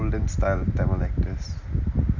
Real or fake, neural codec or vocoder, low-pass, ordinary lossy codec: real; none; 7.2 kHz; none